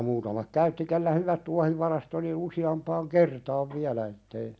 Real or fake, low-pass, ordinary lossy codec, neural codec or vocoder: real; none; none; none